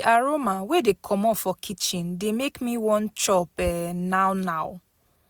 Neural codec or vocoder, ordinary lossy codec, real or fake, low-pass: none; none; real; none